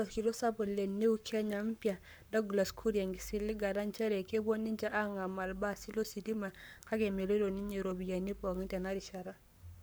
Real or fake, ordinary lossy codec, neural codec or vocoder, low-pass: fake; none; codec, 44.1 kHz, 7.8 kbps, Pupu-Codec; none